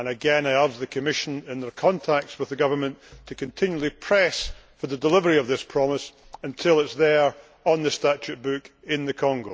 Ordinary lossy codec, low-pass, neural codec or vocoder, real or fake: none; none; none; real